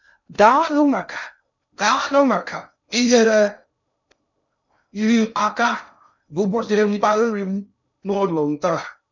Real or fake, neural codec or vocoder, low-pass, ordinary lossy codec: fake; codec, 16 kHz in and 24 kHz out, 0.6 kbps, FocalCodec, streaming, 2048 codes; 7.2 kHz; none